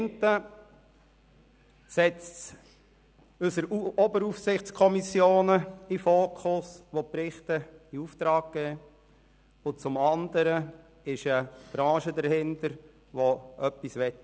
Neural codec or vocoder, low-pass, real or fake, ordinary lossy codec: none; none; real; none